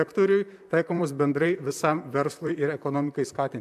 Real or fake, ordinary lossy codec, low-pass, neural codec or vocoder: fake; AAC, 96 kbps; 14.4 kHz; vocoder, 44.1 kHz, 128 mel bands, Pupu-Vocoder